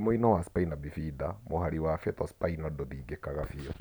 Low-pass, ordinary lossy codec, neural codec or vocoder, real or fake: none; none; none; real